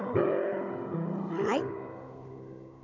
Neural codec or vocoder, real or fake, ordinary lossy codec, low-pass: codec, 16 kHz, 16 kbps, FunCodec, trained on Chinese and English, 50 frames a second; fake; none; 7.2 kHz